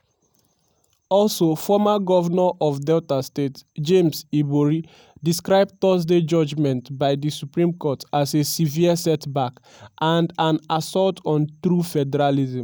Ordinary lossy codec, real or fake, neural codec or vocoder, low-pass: none; real; none; none